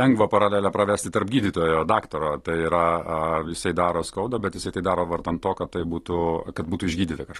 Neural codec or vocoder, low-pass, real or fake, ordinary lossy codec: none; 19.8 kHz; real; AAC, 32 kbps